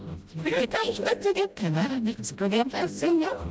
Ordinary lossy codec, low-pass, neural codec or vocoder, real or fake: none; none; codec, 16 kHz, 0.5 kbps, FreqCodec, smaller model; fake